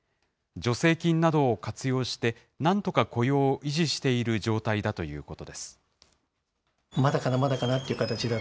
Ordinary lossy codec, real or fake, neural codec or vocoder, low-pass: none; real; none; none